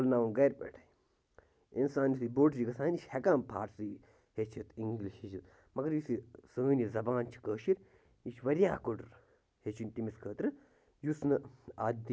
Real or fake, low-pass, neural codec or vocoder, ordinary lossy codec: real; none; none; none